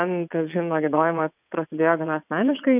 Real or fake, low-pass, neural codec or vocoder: fake; 3.6 kHz; vocoder, 22.05 kHz, 80 mel bands, WaveNeXt